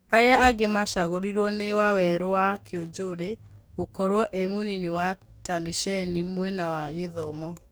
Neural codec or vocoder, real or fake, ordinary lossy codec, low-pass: codec, 44.1 kHz, 2.6 kbps, DAC; fake; none; none